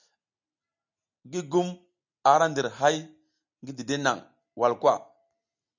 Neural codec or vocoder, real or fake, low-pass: none; real; 7.2 kHz